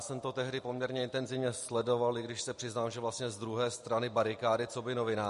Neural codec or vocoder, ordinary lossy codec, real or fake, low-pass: none; MP3, 48 kbps; real; 14.4 kHz